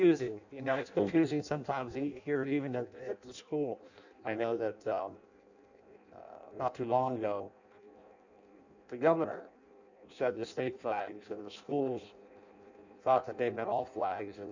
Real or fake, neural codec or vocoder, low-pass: fake; codec, 16 kHz in and 24 kHz out, 0.6 kbps, FireRedTTS-2 codec; 7.2 kHz